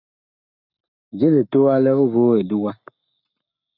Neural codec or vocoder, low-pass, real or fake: codec, 44.1 kHz, 7.8 kbps, Pupu-Codec; 5.4 kHz; fake